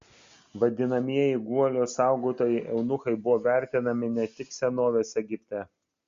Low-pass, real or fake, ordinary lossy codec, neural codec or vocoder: 7.2 kHz; real; Opus, 64 kbps; none